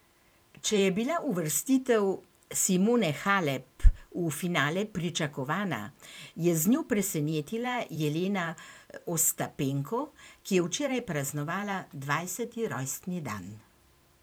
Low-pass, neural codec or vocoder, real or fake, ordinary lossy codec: none; vocoder, 44.1 kHz, 128 mel bands every 512 samples, BigVGAN v2; fake; none